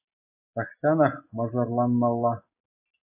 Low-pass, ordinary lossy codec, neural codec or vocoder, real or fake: 3.6 kHz; AAC, 32 kbps; none; real